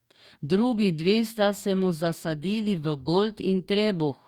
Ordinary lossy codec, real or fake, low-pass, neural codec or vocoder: Opus, 64 kbps; fake; 19.8 kHz; codec, 44.1 kHz, 2.6 kbps, DAC